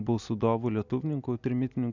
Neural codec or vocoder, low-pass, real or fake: none; 7.2 kHz; real